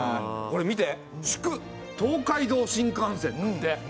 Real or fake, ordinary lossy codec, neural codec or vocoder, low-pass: real; none; none; none